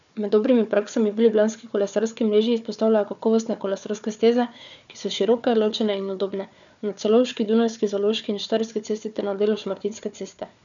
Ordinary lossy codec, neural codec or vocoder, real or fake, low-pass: none; codec, 16 kHz, 4 kbps, FunCodec, trained on Chinese and English, 50 frames a second; fake; 7.2 kHz